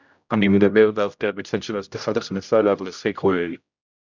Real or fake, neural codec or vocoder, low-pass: fake; codec, 16 kHz, 0.5 kbps, X-Codec, HuBERT features, trained on general audio; 7.2 kHz